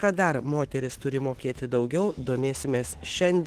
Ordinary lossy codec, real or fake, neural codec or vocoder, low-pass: Opus, 16 kbps; fake; autoencoder, 48 kHz, 32 numbers a frame, DAC-VAE, trained on Japanese speech; 14.4 kHz